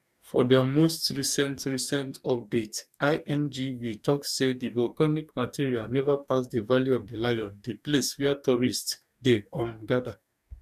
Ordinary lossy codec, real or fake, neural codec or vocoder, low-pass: none; fake; codec, 44.1 kHz, 2.6 kbps, DAC; 14.4 kHz